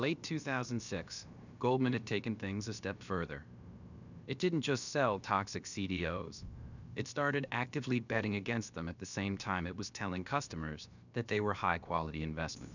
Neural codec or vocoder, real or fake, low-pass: codec, 16 kHz, about 1 kbps, DyCAST, with the encoder's durations; fake; 7.2 kHz